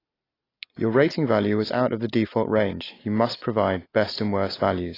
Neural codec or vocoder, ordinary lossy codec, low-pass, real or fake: none; AAC, 24 kbps; 5.4 kHz; real